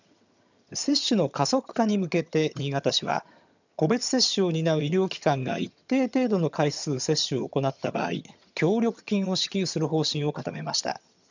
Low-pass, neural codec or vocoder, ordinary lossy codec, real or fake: 7.2 kHz; vocoder, 22.05 kHz, 80 mel bands, HiFi-GAN; none; fake